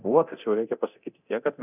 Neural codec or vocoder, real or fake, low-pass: codec, 24 kHz, 0.9 kbps, DualCodec; fake; 3.6 kHz